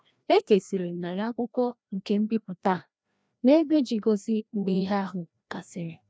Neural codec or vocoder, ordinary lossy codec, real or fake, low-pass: codec, 16 kHz, 1 kbps, FreqCodec, larger model; none; fake; none